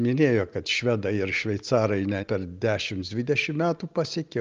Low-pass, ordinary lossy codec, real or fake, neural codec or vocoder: 7.2 kHz; Opus, 24 kbps; real; none